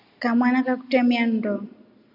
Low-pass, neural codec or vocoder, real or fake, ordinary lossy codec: 5.4 kHz; none; real; MP3, 48 kbps